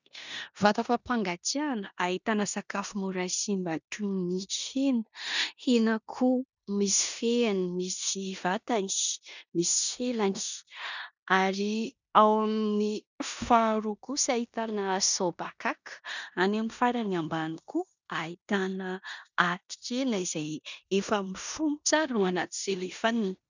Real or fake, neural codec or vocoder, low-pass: fake; codec, 16 kHz in and 24 kHz out, 0.9 kbps, LongCat-Audio-Codec, four codebook decoder; 7.2 kHz